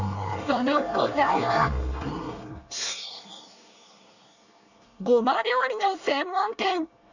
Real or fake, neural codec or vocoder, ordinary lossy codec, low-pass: fake; codec, 24 kHz, 1 kbps, SNAC; none; 7.2 kHz